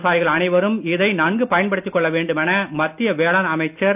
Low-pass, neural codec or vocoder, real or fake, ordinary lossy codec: 3.6 kHz; none; real; none